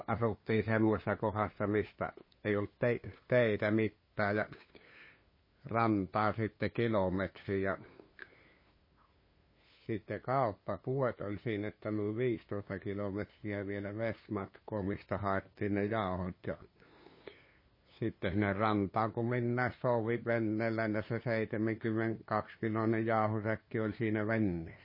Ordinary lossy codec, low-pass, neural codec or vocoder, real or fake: MP3, 24 kbps; 5.4 kHz; codec, 16 kHz, 2 kbps, FunCodec, trained on LibriTTS, 25 frames a second; fake